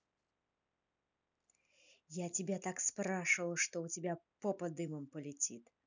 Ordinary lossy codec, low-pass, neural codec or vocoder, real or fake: none; 7.2 kHz; none; real